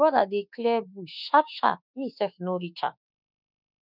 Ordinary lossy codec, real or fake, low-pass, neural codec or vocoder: none; fake; 5.4 kHz; autoencoder, 48 kHz, 32 numbers a frame, DAC-VAE, trained on Japanese speech